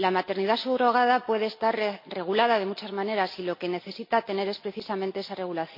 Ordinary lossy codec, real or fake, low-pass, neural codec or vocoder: MP3, 32 kbps; real; 5.4 kHz; none